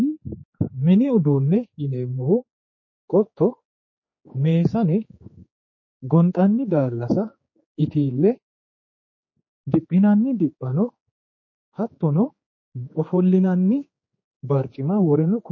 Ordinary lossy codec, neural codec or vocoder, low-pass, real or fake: MP3, 32 kbps; codec, 16 kHz, 4 kbps, X-Codec, HuBERT features, trained on general audio; 7.2 kHz; fake